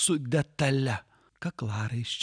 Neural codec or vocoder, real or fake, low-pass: none; real; 9.9 kHz